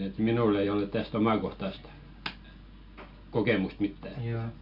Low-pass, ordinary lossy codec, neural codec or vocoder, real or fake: 5.4 kHz; none; none; real